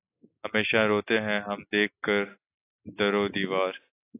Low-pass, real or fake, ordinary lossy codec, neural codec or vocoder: 3.6 kHz; real; AAC, 24 kbps; none